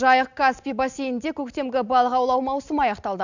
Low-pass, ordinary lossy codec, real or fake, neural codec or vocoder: 7.2 kHz; none; real; none